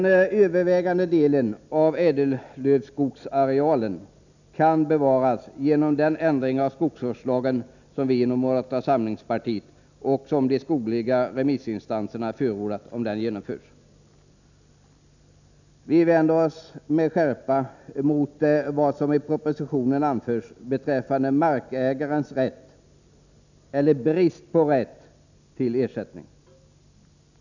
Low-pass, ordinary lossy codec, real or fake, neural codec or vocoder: 7.2 kHz; none; real; none